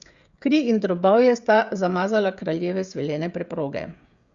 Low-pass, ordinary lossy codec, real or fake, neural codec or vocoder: 7.2 kHz; Opus, 64 kbps; fake; codec, 16 kHz, 16 kbps, FreqCodec, smaller model